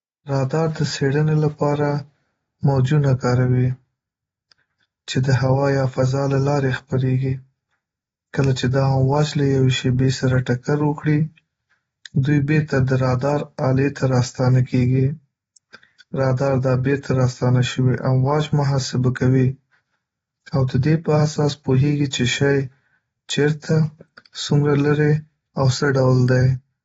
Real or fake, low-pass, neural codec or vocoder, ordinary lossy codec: real; 19.8 kHz; none; AAC, 24 kbps